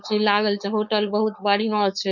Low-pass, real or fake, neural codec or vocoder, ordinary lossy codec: 7.2 kHz; fake; codec, 16 kHz, 4.8 kbps, FACodec; none